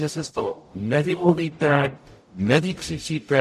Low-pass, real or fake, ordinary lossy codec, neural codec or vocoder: 14.4 kHz; fake; MP3, 64 kbps; codec, 44.1 kHz, 0.9 kbps, DAC